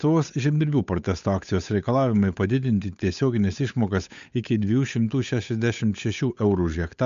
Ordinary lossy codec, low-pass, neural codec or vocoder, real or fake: MP3, 64 kbps; 7.2 kHz; codec, 16 kHz, 8 kbps, FunCodec, trained on Chinese and English, 25 frames a second; fake